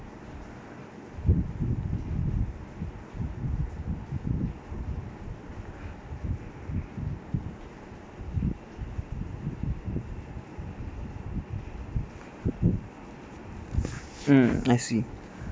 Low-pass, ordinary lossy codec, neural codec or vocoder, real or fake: none; none; none; real